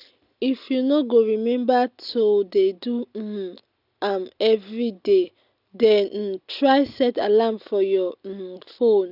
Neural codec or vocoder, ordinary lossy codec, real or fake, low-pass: none; none; real; 5.4 kHz